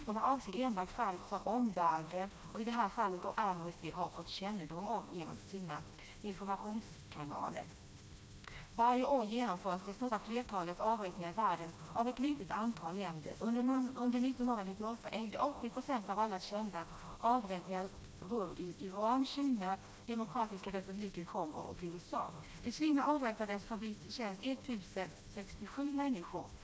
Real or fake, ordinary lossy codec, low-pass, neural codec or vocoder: fake; none; none; codec, 16 kHz, 1 kbps, FreqCodec, smaller model